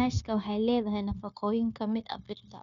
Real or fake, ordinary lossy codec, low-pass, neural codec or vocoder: fake; none; 7.2 kHz; codec, 16 kHz, 0.9 kbps, LongCat-Audio-Codec